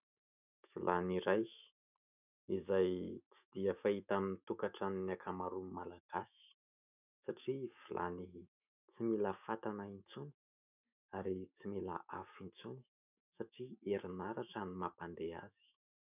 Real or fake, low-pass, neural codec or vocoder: fake; 3.6 kHz; autoencoder, 48 kHz, 128 numbers a frame, DAC-VAE, trained on Japanese speech